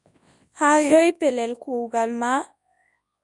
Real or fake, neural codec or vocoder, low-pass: fake; codec, 24 kHz, 0.9 kbps, WavTokenizer, large speech release; 10.8 kHz